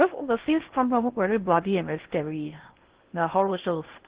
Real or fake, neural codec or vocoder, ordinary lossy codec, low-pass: fake; codec, 16 kHz in and 24 kHz out, 0.6 kbps, FocalCodec, streaming, 2048 codes; Opus, 16 kbps; 3.6 kHz